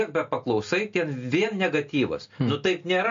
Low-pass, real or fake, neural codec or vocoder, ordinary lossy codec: 7.2 kHz; real; none; MP3, 48 kbps